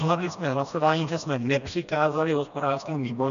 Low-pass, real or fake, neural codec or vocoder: 7.2 kHz; fake; codec, 16 kHz, 1 kbps, FreqCodec, smaller model